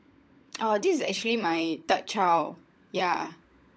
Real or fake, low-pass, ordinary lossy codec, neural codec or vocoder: fake; none; none; codec, 16 kHz, 16 kbps, FunCodec, trained on LibriTTS, 50 frames a second